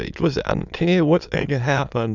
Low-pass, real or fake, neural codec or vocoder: 7.2 kHz; fake; autoencoder, 22.05 kHz, a latent of 192 numbers a frame, VITS, trained on many speakers